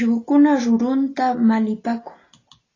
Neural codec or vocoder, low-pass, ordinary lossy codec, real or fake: none; 7.2 kHz; AAC, 32 kbps; real